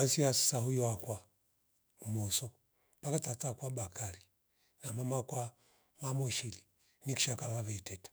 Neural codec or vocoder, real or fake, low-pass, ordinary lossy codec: autoencoder, 48 kHz, 128 numbers a frame, DAC-VAE, trained on Japanese speech; fake; none; none